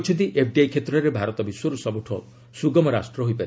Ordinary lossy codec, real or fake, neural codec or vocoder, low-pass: none; real; none; none